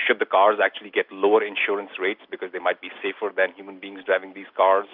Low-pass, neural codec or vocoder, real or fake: 5.4 kHz; none; real